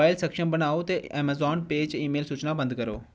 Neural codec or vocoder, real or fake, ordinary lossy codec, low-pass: none; real; none; none